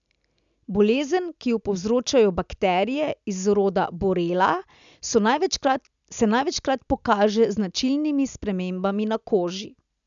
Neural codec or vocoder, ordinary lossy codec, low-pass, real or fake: none; MP3, 96 kbps; 7.2 kHz; real